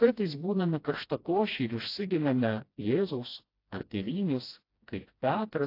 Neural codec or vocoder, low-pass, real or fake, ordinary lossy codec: codec, 16 kHz, 1 kbps, FreqCodec, smaller model; 5.4 kHz; fake; AAC, 32 kbps